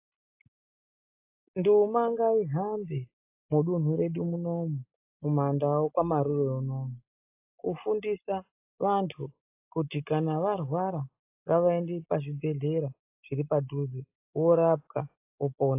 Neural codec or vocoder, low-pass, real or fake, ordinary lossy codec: none; 3.6 kHz; real; AAC, 32 kbps